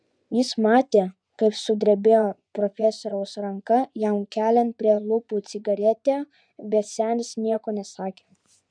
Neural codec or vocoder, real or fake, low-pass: vocoder, 24 kHz, 100 mel bands, Vocos; fake; 9.9 kHz